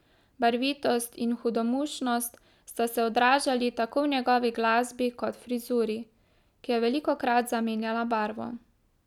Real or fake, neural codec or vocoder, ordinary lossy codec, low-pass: real; none; none; 19.8 kHz